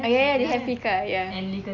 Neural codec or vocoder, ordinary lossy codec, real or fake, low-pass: none; none; real; 7.2 kHz